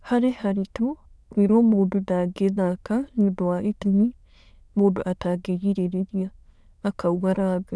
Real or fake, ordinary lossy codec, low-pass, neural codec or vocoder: fake; none; none; autoencoder, 22.05 kHz, a latent of 192 numbers a frame, VITS, trained on many speakers